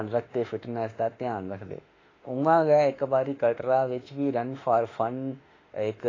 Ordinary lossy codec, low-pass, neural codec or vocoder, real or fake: AAC, 32 kbps; 7.2 kHz; autoencoder, 48 kHz, 32 numbers a frame, DAC-VAE, trained on Japanese speech; fake